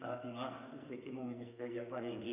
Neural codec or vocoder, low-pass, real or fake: codec, 44.1 kHz, 2.6 kbps, SNAC; 3.6 kHz; fake